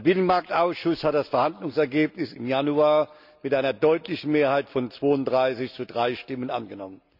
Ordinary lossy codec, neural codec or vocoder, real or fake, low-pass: none; none; real; 5.4 kHz